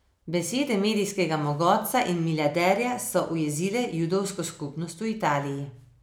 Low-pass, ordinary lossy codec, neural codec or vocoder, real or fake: none; none; none; real